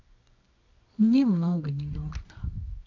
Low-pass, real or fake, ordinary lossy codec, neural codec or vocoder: 7.2 kHz; fake; AAC, 48 kbps; codec, 24 kHz, 0.9 kbps, WavTokenizer, medium music audio release